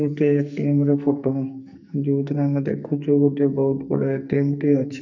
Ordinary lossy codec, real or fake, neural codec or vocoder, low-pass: none; fake; codec, 44.1 kHz, 2.6 kbps, SNAC; 7.2 kHz